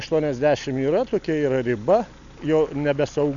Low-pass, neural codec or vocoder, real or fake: 7.2 kHz; none; real